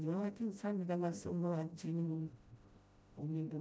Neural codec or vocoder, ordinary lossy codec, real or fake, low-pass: codec, 16 kHz, 0.5 kbps, FreqCodec, smaller model; none; fake; none